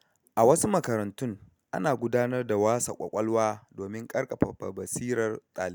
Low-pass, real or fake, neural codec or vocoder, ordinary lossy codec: none; real; none; none